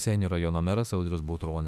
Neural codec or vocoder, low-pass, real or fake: autoencoder, 48 kHz, 32 numbers a frame, DAC-VAE, trained on Japanese speech; 14.4 kHz; fake